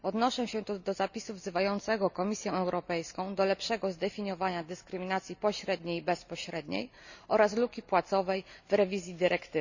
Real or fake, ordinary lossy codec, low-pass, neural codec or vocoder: real; none; 7.2 kHz; none